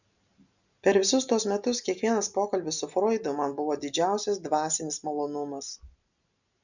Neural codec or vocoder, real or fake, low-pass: none; real; 7.2 kHz